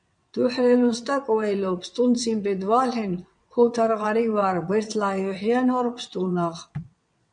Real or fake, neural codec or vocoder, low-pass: fake; vocoder, 22.05 kHz, 80 mel bands, WaveNeXt; 9.9 kHz